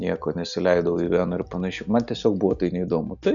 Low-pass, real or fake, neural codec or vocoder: 7.2 kHz; real; none